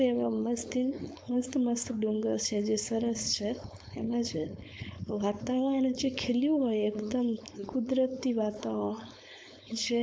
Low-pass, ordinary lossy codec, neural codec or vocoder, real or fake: none; none; codec, 16 kHz, 4.8 kbps, FACodec; fake